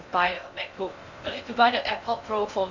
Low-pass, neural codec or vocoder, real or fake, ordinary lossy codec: 7.2 kHz; codec, 16 kHz in and 24 kHz out, 0.6 kbps, FocalCodec, streaming, 2048 codes; fake; none